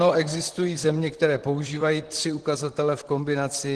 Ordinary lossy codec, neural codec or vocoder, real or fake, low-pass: Opus, 16 kbps; vocoder, 44.1 kHz, 128 mel bands, Pupu-Vocoder; fake; 10.8 kHz